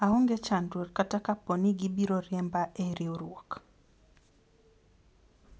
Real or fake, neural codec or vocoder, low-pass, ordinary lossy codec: real; none; none; none